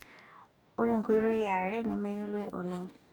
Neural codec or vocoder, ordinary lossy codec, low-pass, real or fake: codec, 44.1 kHz, 2.6 kbps, DAC; none; none; fake